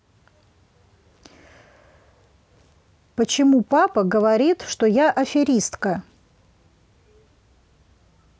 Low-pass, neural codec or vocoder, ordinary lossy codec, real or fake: none; none; none; real